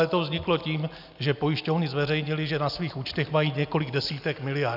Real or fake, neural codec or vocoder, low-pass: real; none; 5.4 kHz